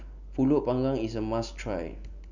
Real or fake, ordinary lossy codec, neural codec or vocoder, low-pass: real; none; none; 7.2 kHz